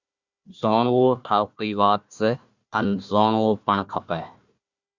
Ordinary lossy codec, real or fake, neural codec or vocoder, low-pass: Opus, 64 kbps; fake; codec, 16 kHz, 1 kbps, FunCodec, trained on Chinese and English, 50 frames a second; 7.2 kHz